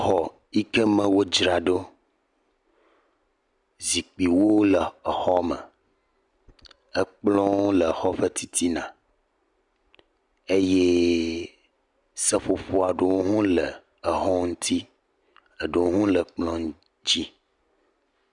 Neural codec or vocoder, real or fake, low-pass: none; real; 10.8 kHz